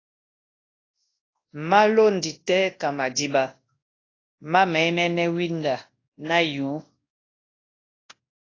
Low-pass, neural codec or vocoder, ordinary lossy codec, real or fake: 7.2 kHz; codec, 24 kHz, 0.9 kbps, WavTokenizer, large speech release; AAC, 32 kbps; fake